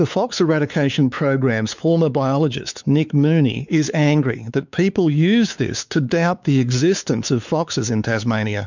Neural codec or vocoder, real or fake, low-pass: codec, 16 kHz, 4 kbps, X-Codec, WavLM features, trained on Multilingual LibriSpeech; fake; 7.2 kHz